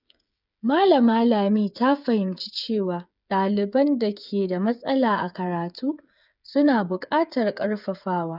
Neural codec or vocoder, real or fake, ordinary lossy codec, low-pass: codec, 16 kHz, 8 kbps, FreqCodec, smaller model; fake; none; 5.4 kHz